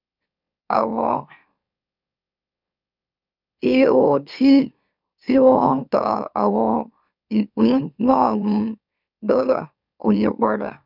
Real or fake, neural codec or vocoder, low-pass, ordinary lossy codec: fake; autoencoder, 44.1 kHz, a latent of 192 numbers a frame, MeloTTS; 5.4 kHz; none